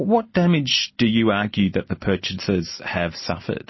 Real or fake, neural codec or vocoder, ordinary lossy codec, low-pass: real; none; MP3, 24 kbps; 7.2 kHz